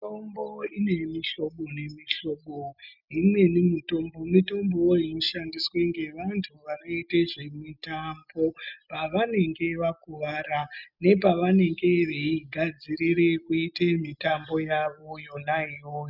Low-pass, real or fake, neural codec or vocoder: 5.4 kHz; real; none